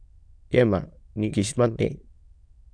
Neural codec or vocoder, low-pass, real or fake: autoencoder, 22.05 kHz, a latent of 192 numbers a frame, VITS, trained on many speakers; 9.9 kHz; fake